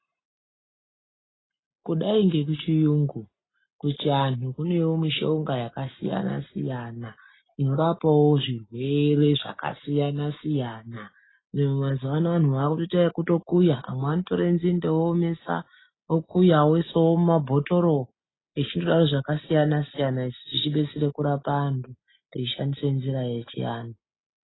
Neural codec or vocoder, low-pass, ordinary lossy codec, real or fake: none; 7.2 kHz; AAC, 16 kbps; real